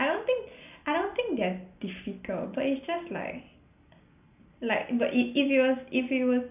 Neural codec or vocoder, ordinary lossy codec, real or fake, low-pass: none; none; real; 3.6 kHz